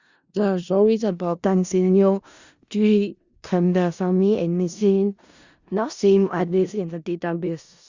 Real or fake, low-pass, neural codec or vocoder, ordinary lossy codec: fake; 7.2 kHz; codec, 16 kHz in and 24 kHz out, 0.4 kbps, LongCat-Audio-Codec, four codebook decoder; Opus, 64 kbps